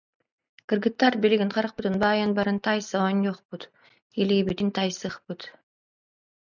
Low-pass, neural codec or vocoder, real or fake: 7.2 kHz; none; real